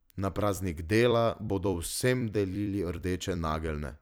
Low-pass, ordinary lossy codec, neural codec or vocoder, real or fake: none; none; vocoder, 44.1 kHz, 128 mel bands every 256 samples, BigVGAN v2; fake